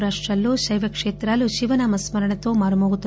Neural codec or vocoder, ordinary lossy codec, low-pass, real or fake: none; none; none; real